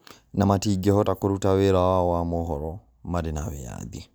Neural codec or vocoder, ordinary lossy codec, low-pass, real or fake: none; none; none; real